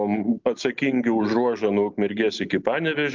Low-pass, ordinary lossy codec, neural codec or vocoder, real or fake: 7.2 kHz; Opus, 32 kbps; none; real